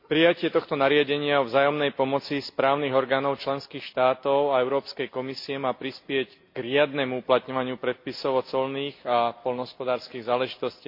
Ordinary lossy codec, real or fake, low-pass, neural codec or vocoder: MP3, 32 kbps; real; 5.4 kHz; none